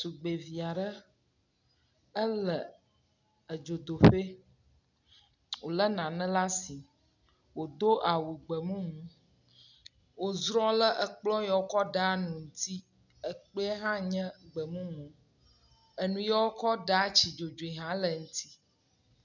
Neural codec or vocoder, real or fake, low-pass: none; real; 7.2 kHz